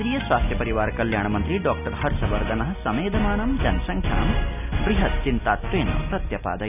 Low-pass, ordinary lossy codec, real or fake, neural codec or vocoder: 3.6 kHz; none; real; none